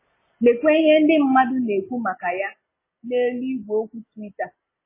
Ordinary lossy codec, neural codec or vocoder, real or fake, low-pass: MP3, 16 kbps; none; real; 3.6 kHz